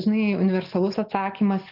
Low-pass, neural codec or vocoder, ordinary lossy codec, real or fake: 5.4 kHz; none; Opus, 24 kbps; real